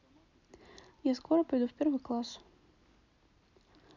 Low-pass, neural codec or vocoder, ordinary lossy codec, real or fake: 7.2 kHz; none; none; real